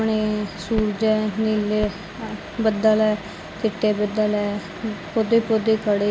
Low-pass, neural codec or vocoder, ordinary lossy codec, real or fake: none; none; none; real